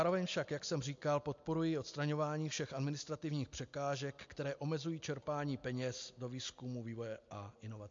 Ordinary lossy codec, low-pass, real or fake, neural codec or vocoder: MP3, 48 kbps; 7.2 kHz; real; none